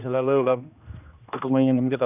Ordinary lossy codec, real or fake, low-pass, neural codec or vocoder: none; fake; 3.6 kHz; codec, 16 kHz, 1 kbps, X-Codec, HuBERT features, trained on general audio